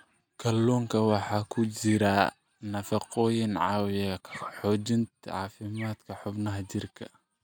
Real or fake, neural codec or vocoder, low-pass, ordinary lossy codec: real; none; none; none